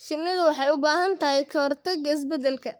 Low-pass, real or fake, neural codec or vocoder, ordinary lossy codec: none; fake; codec, 44.1 kHz, 3.4 kbps, Pupu-Codec; none